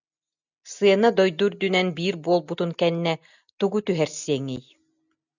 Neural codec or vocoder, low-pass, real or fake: none; 7.2 kHz; real